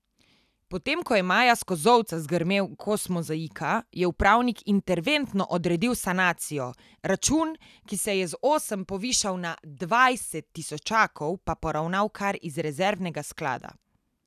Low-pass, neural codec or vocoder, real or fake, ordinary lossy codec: 14.4 kHz; none; real; none